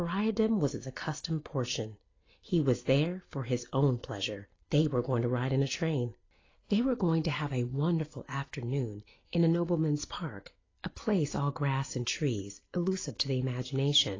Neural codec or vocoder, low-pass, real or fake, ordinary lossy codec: none; 7.2 kHz; real; AAC, 32 kbps